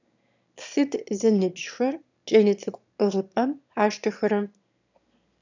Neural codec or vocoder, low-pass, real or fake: autoencoder, 22.05 kHz, a latent of 192 numbers a frame, VITS, trained on one speaker; 7.2 kHz; fake